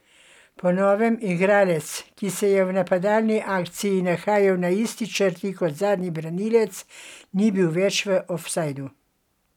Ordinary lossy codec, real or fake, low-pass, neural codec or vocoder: none; real; 19.8 kHz; none